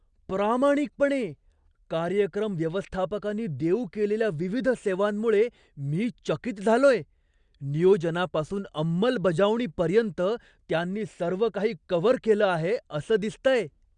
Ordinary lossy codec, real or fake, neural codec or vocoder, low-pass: Opus, 64 kbps; real; none; 9.9 kHz